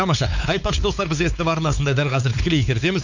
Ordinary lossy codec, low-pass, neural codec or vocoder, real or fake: none; 7.2 kHz; codec, 16 kHz, 4 kbps, X-Codec, WavLM features, trained on Multilingual LibriSpeech; fake